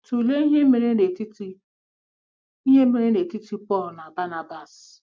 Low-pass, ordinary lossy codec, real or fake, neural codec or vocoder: none; none; real; none